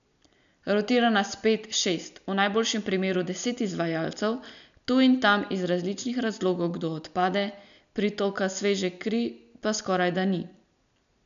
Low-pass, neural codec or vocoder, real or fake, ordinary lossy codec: 7.2 kHz; none; real; none